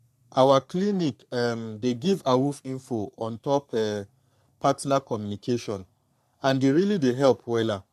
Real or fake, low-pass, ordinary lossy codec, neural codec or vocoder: fake; 14.4 kHz; none; codec, 44.1 kHz, 3.4 kbps, Pupu-Codec